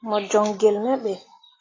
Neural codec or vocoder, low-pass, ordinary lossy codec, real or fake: none; 7.2 kHz; AAC, 32 kbps; real